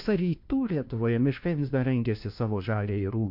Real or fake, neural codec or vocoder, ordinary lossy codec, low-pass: fake; codec, 16 kHz, 1 kbps, FunCodec, trained on LibriTTS, 50 frames a second; MP3, 48 kbps; 5.4 kHz